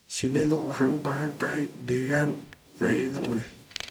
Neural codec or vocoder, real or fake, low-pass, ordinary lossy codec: codec, 44.1 kHz, 0.9 kbps, DAC; fake; none; none